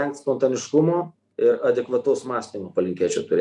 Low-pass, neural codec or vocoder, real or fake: 10.8 kHz; none; real